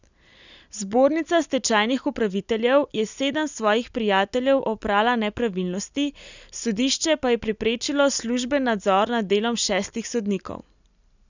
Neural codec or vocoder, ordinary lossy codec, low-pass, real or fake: none; none; 7.2 kHz; real